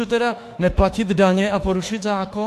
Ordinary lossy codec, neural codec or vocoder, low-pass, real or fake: AAC, 64 kbps; autoencoder, 48 kHz, 32 numbers a frame, DAC-VAE, trained on Japanese speech; 14.4 kHz; fake